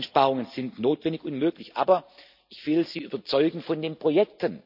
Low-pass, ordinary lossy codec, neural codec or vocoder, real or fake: 5.4 kHz; none; none; real